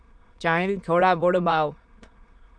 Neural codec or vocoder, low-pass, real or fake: autoencoder, 22.05 kHz, a latent of 192 numbers a frame, VITS, trained on many speakers; 9.9 kHz; fake